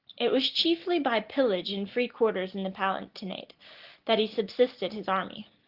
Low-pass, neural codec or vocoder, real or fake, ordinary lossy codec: 5.4 kHz; none; real; Opus, 16 kbps